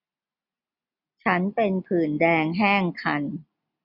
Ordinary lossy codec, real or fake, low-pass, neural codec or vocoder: none; real; 5.4 kHz; none